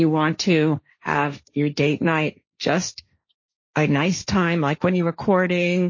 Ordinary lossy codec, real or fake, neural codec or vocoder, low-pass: MP3, 32 kbps; fake; codec, 16 kHz, 1.1 kbps, Voila-Tokenizer; 7.2 kHz